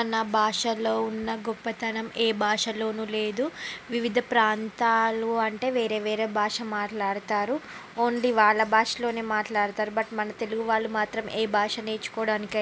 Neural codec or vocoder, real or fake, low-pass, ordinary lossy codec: none; real; none; none